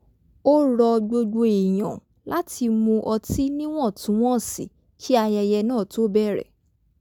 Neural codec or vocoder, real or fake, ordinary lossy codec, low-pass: none; real; none; 19.8 kHz